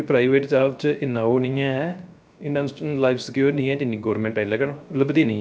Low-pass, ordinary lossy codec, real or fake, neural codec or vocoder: none; none; fake; codec, 16 kHz, 0.3 kbps, FocalCodec